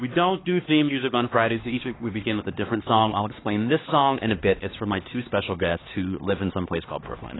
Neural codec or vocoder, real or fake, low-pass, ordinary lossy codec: codec, 16 kHz, 2 kbps, X-Codec, HuBERT features, trained on LibriSpeech; fake; 7.2 kHz; AAC, 16 kbps